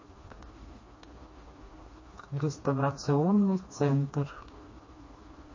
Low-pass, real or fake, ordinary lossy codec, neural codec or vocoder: 7.2 kHz; fake; MP3, 32 kbps; codec, 16 kHz, 2 kbps, FreqCodec, smaller model